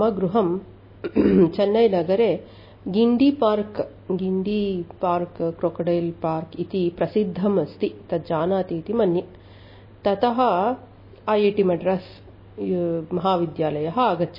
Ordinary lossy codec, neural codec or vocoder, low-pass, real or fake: MP3, 24 kbps; none; 5.4 kHz; real